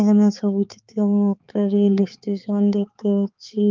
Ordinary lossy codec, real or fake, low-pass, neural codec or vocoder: Opus, 32 kbps; fake; 7.2 kHz; codec, 16 kHz, 4 kbps, X-Codec, HuBERT features, trained on balanced general audio